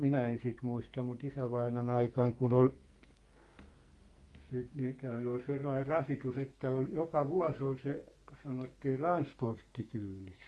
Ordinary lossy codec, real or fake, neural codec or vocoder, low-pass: Opus, 32 kbps; fake; codec, 44.1 kHz, 2.6 kbps, SNAC; 10.8 kHz